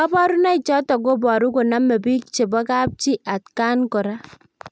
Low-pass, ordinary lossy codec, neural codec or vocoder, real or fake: none; none; none; real